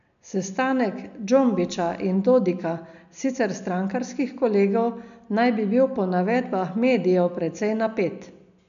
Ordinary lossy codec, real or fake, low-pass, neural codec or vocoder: none; real; 7.2 kHz; none